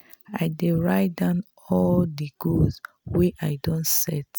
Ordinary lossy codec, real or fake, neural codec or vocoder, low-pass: none; real; none; none